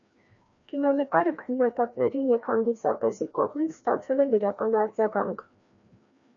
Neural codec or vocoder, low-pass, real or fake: codec, 16 kHz, 1 kbps, FreqCodec, larger model; 7.2 kHz; fake